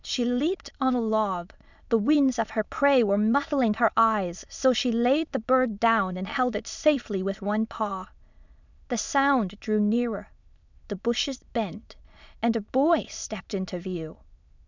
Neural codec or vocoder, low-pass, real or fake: autoencoder, 22.05 kHz, a latent of 192 numbers a frame, VITS, trained on many speakers; 7.2 kHz; fake